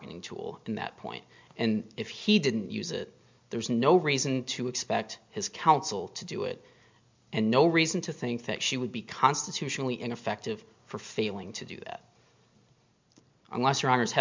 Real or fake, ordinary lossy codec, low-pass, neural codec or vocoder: real; MP3, 64 kbps; 7.2 kHz; none